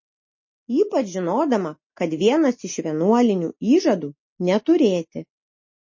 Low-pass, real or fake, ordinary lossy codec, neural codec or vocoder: 7.2 kHz; real; MP3, 32 kbps; none